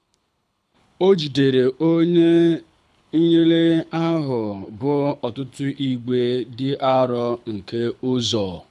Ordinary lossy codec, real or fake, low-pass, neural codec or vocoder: none; fake; none; codec, 24 kHz, 6 kbps, HILCodec